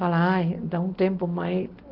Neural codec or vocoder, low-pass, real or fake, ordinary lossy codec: none; 5.4 kHz; real; Opus, 16 kbps